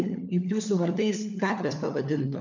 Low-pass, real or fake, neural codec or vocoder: 7.2 kHz; fake; codec, 16 kHz, 4 kbps, FunCodec, trained on LibriTTS, 50 frames a second